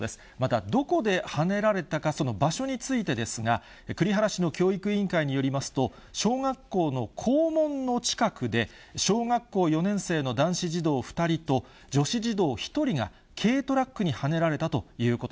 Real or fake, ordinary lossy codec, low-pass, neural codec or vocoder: real; none; none; none